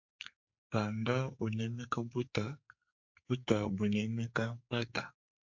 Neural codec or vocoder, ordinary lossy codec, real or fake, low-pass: codec, 44.1 kHz, 2.6 kbps, SNAC; MP3, 48 kbps; fake; 7.2 kHz